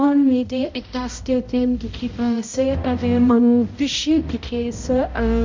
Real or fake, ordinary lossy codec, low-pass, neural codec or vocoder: fake; MP3, 48 kbps; 7.2 kHz; codec, 16 kHz, 0.5 kbps, X-Codec, HuBERT features, trained on balanced general audio